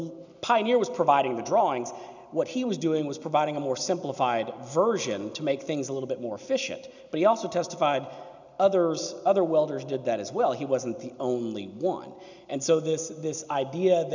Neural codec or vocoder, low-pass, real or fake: none; 7.2 kHz; real